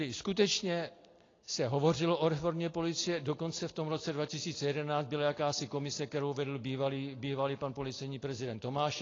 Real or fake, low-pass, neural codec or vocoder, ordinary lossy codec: real; 7.2 kHz; none; AAC, 32 kbps